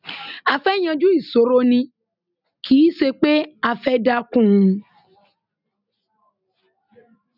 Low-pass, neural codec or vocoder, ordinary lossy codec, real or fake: 5.4 kHz; none; none; real